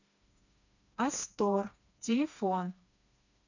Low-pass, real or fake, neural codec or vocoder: 7.2 kHz; fake; codec, 16 kHz, 2 kbps, FreqCodec, smaller model